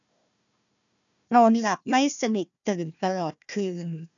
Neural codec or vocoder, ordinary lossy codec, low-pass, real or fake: codec, 16 kHz, 1 kbps, FunCodec, trained on Chinese and English, 50 frames a second; none; 7.2 kHz; fake